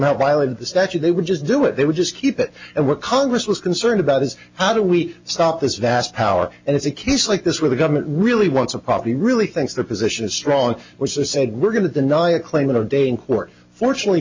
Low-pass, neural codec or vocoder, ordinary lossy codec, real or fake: 7.2 kHz; none; MP3, 64 kbps; real